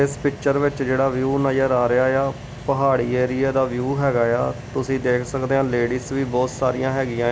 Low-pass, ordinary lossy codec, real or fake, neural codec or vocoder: none; none; real; none